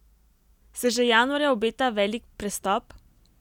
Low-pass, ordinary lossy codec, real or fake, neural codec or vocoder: 19.8 kHz; none; real; none